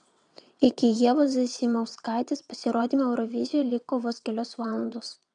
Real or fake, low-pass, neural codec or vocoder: fake; 9.9 kHz; vocoder, 22.05 kHz, 80 mel bands, WaveNeXt